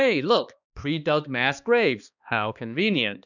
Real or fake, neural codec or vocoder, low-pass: fake; codec, 16 kHz, 2 kbps, X-Codec, HuBERT features, trained on balanced general audio; 7.2 kHz